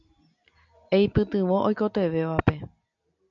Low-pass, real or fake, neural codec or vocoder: 7.2 kHz; real; none